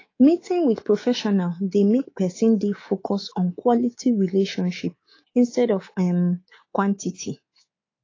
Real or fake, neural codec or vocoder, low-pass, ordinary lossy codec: fake; codec, 24 kHz, 3.1 kbps, DualCodec; 7.2 kHz; AAC, 32 kbps